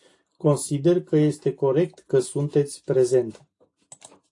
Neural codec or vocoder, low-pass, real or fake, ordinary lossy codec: none; 10.8 kHz; real; AAC, 48 kbps